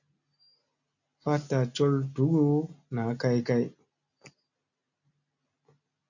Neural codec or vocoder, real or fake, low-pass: none; real; 7.2 kHz